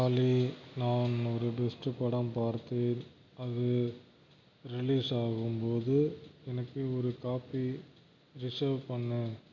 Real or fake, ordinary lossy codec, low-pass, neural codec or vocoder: real; none; 7.2 kHz; none